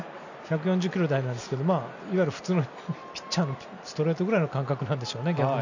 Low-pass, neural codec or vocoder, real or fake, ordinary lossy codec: 7.2 kHz; none; real; none